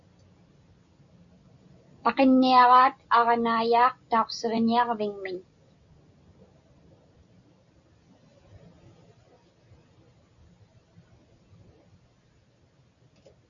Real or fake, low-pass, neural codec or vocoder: real; 7.2 kHz; none